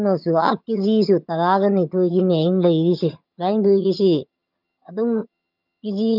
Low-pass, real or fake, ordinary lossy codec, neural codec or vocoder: 5.4 kHz; fake; none; vocoder, 22.05 kHz, 80 mel bands, HiFi-GAN